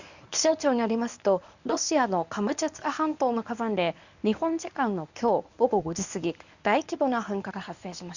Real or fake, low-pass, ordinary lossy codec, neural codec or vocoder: fake; 7.2 kHz; none; codec, 24 kHz, 0.9 kbps, WavTokenizer, medium speech release version 1